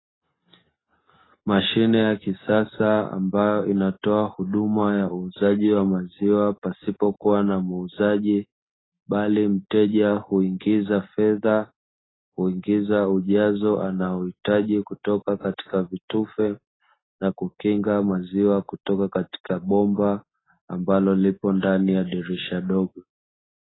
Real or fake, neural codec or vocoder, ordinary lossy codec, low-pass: real; none; AAC, 16 kbps; 7.2 kHz